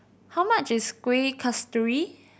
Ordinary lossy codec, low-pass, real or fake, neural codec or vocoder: none; none; real; none